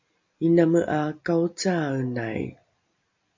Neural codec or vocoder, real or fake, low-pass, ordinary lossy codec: none; real; 7.2 kHz; MP3, 48 kbps